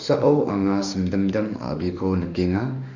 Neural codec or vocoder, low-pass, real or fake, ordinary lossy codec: autoencoder, 48 kHz, 32 numbers a frame, DAC-VAE, trained on Japanese speech; 7.2 kHz; fake; none